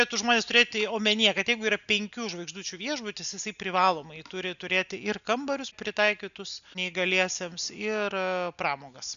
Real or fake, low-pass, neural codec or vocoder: real; 7.2 kHz; none